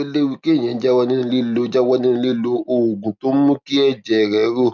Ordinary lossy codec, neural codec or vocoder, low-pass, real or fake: none; none; 7.2 kHz; real